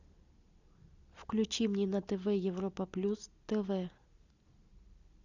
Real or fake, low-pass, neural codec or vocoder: real; 7.2 kHz; none